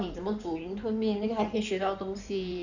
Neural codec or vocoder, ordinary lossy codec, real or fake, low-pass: codec, 16 kHz in and 24 kHz out, 2.2 kbps, FireRedTTS-2 codec; none; fake; 7.2 kHz